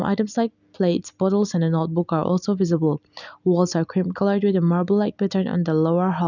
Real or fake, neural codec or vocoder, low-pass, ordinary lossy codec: real; none; 7.2 kHz; none